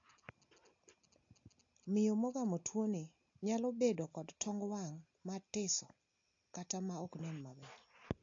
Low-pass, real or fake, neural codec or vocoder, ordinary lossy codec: 7.2 kHz; real; none; AAC, 48 kbps